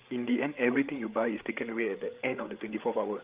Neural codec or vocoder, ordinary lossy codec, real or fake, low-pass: codec, 16 kHz, 8 kbps, FreqCodec, larger model; Opus, 32 kbps; fake; 3.6 kHz